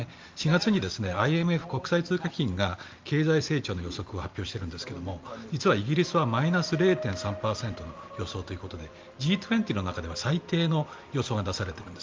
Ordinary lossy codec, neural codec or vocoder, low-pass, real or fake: Opus, 32 kbps; none; 7.2 kHz; real